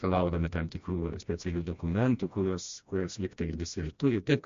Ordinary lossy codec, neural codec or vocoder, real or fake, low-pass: MP3, 48 kbps; codec, 16 kHz, 1 kbps, FreqCodec, smaller model; fake; 7.2 kHz